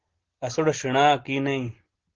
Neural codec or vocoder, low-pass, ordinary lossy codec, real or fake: none; 7.2 kHz; Opus, 16 kbps; real